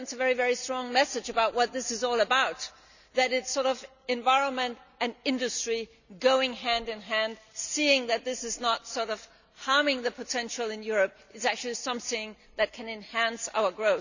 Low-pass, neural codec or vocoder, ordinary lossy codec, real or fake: 7.2 kHz; none; none; real